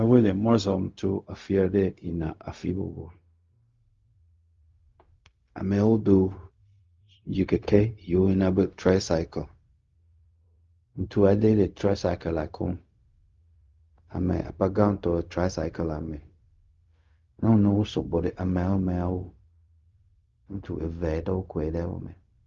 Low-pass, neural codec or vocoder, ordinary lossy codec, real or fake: 7.2 kHz; codec, 16 kHz, 0.4 kbps, LongCat-Audio-Codec; Opus, 32 kbps; fake